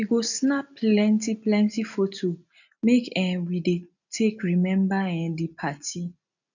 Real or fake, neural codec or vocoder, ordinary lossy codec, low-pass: real; none; none; 7.2 kHz